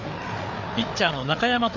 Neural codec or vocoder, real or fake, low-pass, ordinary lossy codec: codec, 16 kHz, 4 kbps, FreqCodec, larger model; fake; 7.2 kHz; none